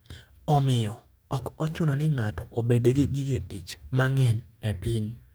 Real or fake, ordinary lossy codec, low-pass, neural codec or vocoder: fake; none; none; codec, 44.1 kHz, 2.6 kbps, DAC